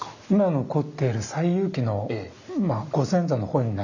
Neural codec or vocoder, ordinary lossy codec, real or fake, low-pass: none; none; real; 7.2 kHz